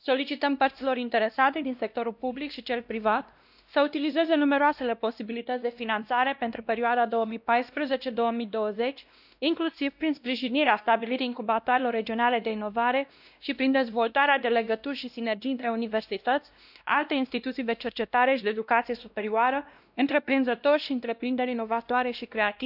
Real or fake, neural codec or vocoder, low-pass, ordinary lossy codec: fake; codec, 16 kHz, 1 kbps, X-Codec, WavLM features, trained on Multilingual LibriSpeech; 5.4 kHz; none